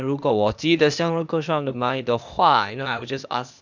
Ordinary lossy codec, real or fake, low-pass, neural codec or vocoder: none; fake; 7.2 kHz; codec, 16 kHz, 0.8 kbps, ZipCodec